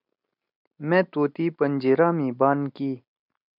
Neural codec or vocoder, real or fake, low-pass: none; real; 5.4 kHz